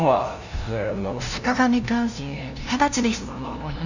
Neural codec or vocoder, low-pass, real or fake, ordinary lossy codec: codec, 16 kHz, 0.5 kbps, FunCodec, trained on LibriTTS, 25 frames a second; 7.2 kHz; fake; none